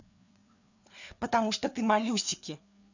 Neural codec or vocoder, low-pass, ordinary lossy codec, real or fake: codec, 16 kHz, 4 kbps, FreqCodec, larger model; 7.2 kHz; none; fake